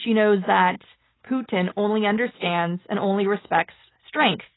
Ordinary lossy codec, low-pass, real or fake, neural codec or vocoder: AAC, 16 kbps; 7.2 kHz; real; none